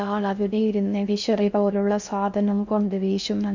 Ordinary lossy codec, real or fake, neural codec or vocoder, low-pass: none; fake; codec, 16 kHz in and 24 kHz out, 0.6 kbps, FocalCodec, streaming, 4096 codes; 7.2 kHz